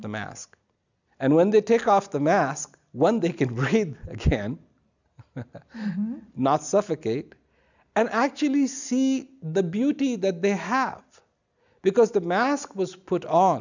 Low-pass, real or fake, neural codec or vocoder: 7.2 kHz; real; none